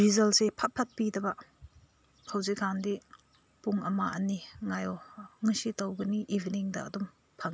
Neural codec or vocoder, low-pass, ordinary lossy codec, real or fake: none; none; none; real